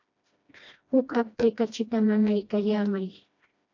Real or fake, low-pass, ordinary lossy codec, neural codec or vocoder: fake; 7.2 kHz; AAC, 48 kbps; codec, 16 kHz, 1 kbps, FreqCodec, smaller model